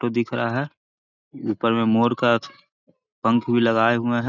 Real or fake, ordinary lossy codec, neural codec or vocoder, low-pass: real; none; none; 7.2 kHz